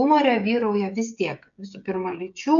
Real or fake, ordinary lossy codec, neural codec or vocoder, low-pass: fake; AAC, 64 kbps; codec, 16 kHz, 16 kbps, FreqCodec, smaller model; 7.2 kHz